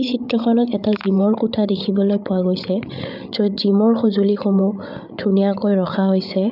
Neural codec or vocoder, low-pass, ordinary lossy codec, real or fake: codec, 16 kHz, 8 kbps, FreqCodec, larger model; 5.4 kHz; none; fake